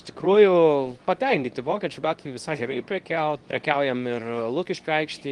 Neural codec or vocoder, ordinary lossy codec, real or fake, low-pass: codec, 24 kHz, 0.9 kbps, WavTokenizer, medium speech release version 2; Opus, 24 kbps; fake; 10.8 kHz